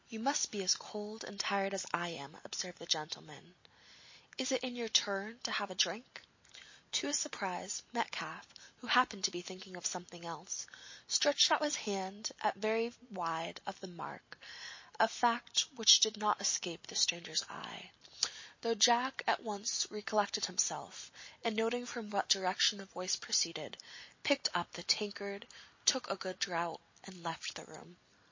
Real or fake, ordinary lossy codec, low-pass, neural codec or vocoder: real; MP3, 32 kbps; 7.2 kHz; none